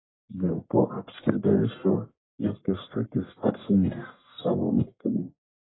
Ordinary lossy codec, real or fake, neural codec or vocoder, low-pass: AAC, 16 kbps; fake; codec, 44.1 kHz, 1.7 kbps, Pupu-Codec; 7.2 kHz